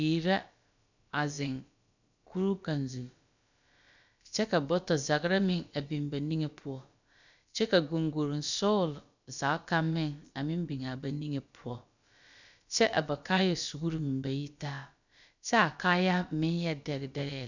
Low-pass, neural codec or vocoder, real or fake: 7.2 kHz; codec, 16 kHz, about 1 kbps, DyCAST, with the encoder's durations; fake